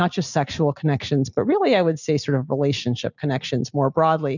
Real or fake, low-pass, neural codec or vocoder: real; 7.2 kHz; none